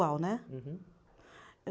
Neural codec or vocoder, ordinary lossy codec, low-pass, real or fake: none; none; none; real